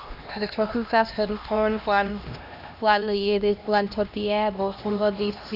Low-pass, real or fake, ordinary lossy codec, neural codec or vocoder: 5.4 kHz; fake; none; codec, 16 kHz, 1 kbps, X-Codec, HuBERT features, trained on LibriSpeech